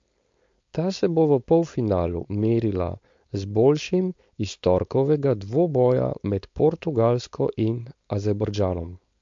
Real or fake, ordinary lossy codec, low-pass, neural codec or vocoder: fake; MP3, 48 kbps; 7.2 kHz; codec, 16 kHz, 4.8 kbps, FACodec